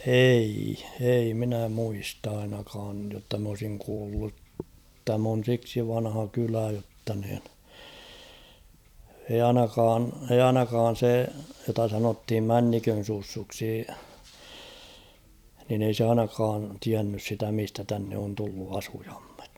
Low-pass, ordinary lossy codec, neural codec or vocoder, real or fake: 19.8 kHz; none; vocoder, 44.1 kHz, 128 mel bands every 256 samples, BigVGAN v2; fake